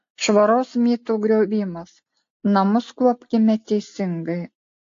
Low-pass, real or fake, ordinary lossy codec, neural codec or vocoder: 7.2 kHz; real; AAC, 48 kbps; none